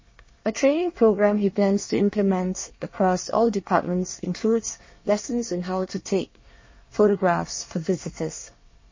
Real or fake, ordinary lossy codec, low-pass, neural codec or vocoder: fake; MP3, 32 kbps; 7.2 kHz; codec, 24 kHz, 1 kbps, SNAC